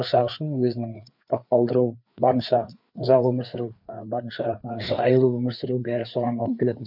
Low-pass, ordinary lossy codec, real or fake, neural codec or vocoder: 5.4 kHz; none; fake; codec, 16 kHz, 4 kbps, FreqCodec, larger model